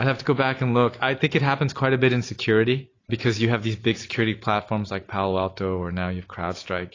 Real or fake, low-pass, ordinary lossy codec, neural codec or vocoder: real; 7.2 kHz; AAC, 32 kbps; none